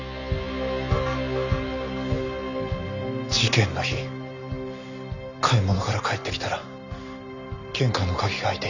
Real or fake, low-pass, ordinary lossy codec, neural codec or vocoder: real; 7.2 kHz; none; none